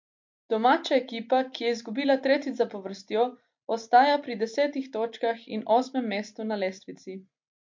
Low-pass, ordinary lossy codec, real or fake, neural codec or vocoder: 7.2 kHz; MP3, 48 kbps; real; none